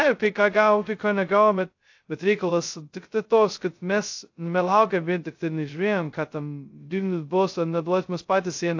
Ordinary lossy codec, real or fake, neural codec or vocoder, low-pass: AAC, 48 kbps; fake; codec, 16 kHz, 0.2 kbps, FocalCodec; 7.2 kHz